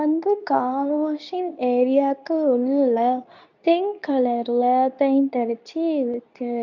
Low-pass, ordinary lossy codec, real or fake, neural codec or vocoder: 7.2 kHz; none; fake; codec, 24 kHz, 0.9 kbps, WavTokenizer, medium speech release version 2